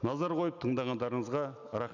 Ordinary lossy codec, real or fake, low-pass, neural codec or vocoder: none; real; 7.2 kHz; none